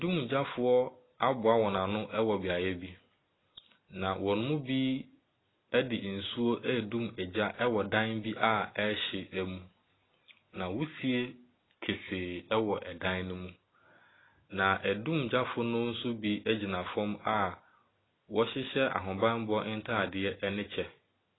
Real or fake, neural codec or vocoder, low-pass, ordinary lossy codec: real; none; 7.2 kHz; AAC, 16 kbps